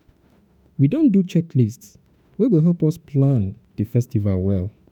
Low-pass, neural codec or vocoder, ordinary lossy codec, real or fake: 19.8 kHz; autoencoder, 48 kHz, 32 numbers a frame, DAC-VAE, trained on Japanese speech; none; fake